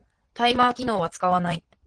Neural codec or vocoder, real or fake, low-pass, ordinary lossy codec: vocoder, 22.05 kHz, 80 mel bands, Vocos; fake; 9.9 kHz; Opus, 16 kbps